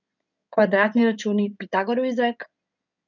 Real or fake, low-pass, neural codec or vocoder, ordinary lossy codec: fake; 7.2 kHz; codec, 16 kHz in and 24 kHz out, 2.2 kbps, FireRedTTS-2 codec; none